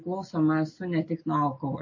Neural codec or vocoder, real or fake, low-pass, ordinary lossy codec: none; real; 7.2 kHz; MP3, 32 kbps